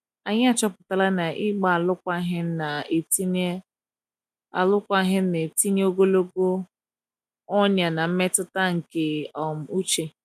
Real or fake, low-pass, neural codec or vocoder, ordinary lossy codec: real; 14.4 kHz; none; none